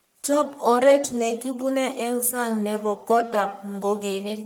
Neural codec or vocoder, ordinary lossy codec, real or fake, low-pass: codec, 44.1 kHz, 1.7 kbps, Pupu-Codec; none; fake; none